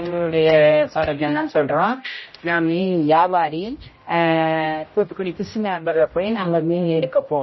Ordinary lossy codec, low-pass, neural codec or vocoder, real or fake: MP3, 24 kbps; 7.2 kHz; codec, 16 kHz, 0.5 kbps, X-Codec, HuBERT features, trained on general audio; fake